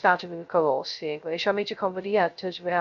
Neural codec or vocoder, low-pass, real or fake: codec, 16 kHz, 0.2 kbps, FocalCodec; 7.2 kHz; fake